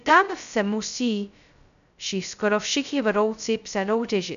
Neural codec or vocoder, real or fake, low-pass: codec, 16 kHz, 0.2 kbps, FocalCodec; fake; 7.2 kHz